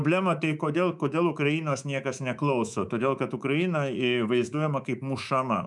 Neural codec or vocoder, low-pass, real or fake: codec, 24 kHz, 3.1 kbps, DualCodec; 10.8 kHz; fake